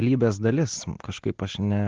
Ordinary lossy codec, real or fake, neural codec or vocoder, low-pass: Opus, 16 kbps; real; none; 7.2 kHz